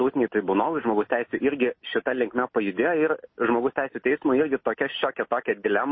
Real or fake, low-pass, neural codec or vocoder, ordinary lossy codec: real; 7.2 kHz; none; MP3, 24 kbps